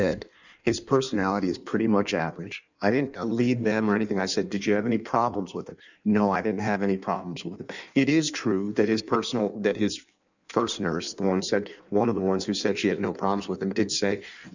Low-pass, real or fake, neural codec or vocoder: 7.2 kHz; fake; codec, 16 kHz in and 24 kHz out, 1.1 kbps, FireRedTTS-2 codec